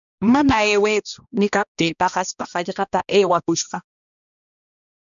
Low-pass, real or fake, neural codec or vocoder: 7.2 kHz; fake; codec, 16 kHz, 1 kbps, X-Codec, HuBERT features, trained on balanced general audio